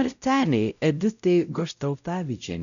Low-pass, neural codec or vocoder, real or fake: 7.2 kHz; codec, 16 kHz, 0.5 kbps, X-Codec, WavLM features, trained on Multilingual LibriSpeech; fake